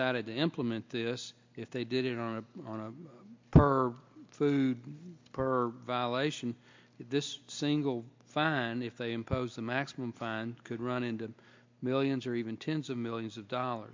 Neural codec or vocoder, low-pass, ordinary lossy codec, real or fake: none; 7.2 kHz; MP3, 48 kbps; real